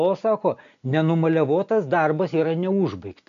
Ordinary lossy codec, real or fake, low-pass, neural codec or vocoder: MP3, 96 kbps; real; 7.2 kHz; none